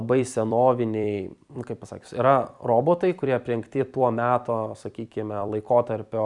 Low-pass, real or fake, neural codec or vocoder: 10.8 kHz; fake; vocoder, 44.1 kHz, 128 mel bands every 256 samples, BigVGAN v2